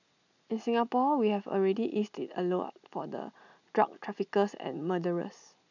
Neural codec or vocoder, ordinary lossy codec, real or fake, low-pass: none; none; real; 7.2 kHz